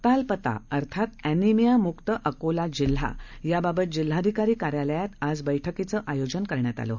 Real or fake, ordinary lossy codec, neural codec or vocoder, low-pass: real; none; none; 7.2 kHz